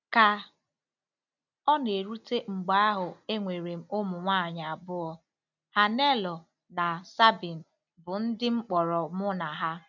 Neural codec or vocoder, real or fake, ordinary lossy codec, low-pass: none; real; none; 7.2 kHz